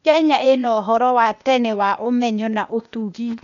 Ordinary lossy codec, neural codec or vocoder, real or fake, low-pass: none; codec, 16 kHz, 0.8 kbps, ZipCodec; fake; 7.2 kHz